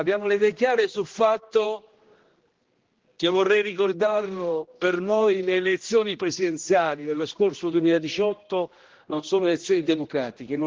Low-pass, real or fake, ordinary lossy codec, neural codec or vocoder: 7.2 kHz; fake; Opus, 16 kbps; codec, 16 kHz, 1 kbps, X-Codec, HuBERT features, trained on general audio